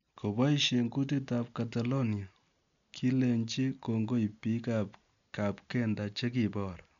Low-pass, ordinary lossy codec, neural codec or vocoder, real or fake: 7.2 kHz; none; none; real